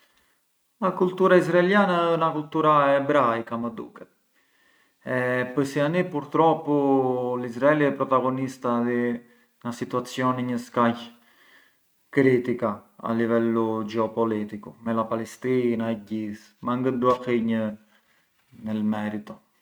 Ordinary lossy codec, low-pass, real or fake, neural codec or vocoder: none; none; real; none